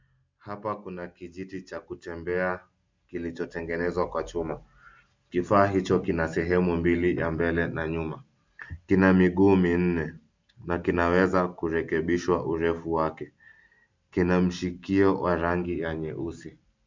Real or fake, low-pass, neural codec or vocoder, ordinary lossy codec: real; 7.2 kHz; none; MP3, 64 kbps